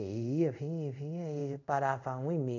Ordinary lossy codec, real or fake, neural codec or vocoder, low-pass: none; fake; codec, 24 kHz, 0.5 kbps, DualCodec; 7.2 kHz